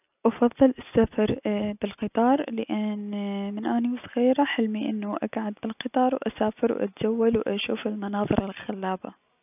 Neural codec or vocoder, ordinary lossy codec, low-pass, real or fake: none; none; 3.6 kHz; real